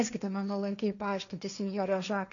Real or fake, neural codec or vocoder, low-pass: fake; codec, 16 kHz, 1.1 kbps, Voila-Tokenizer; 7.2 kHz